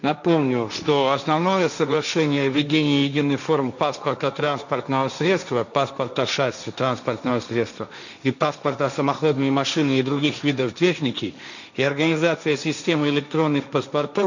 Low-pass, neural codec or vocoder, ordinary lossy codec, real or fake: 7.2 kHz; codec, 16 kHz, 1.1 kbps, Voila-Tokenizer; none; fake